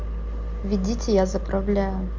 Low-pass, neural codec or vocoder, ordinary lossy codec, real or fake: 7.2 kHz; none; Opus, 32 kbps; real